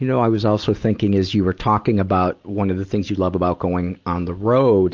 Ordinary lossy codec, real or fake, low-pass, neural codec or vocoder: Opus, 24 kbps; real; 7.2 kHz; none